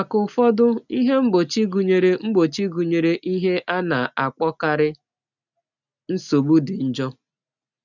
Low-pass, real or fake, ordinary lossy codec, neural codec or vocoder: 7.2 kHz; real; none; none